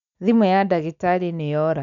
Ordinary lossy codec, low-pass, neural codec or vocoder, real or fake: none; 7.2 kHz; none; real